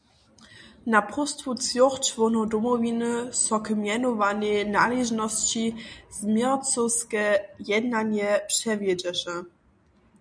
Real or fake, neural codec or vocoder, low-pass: real; none; 9.9 kHz